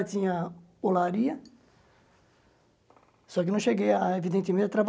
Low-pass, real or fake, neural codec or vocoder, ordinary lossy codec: none; real; none; none